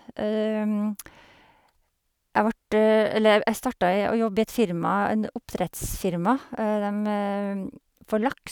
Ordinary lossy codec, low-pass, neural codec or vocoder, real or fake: none; none; none; real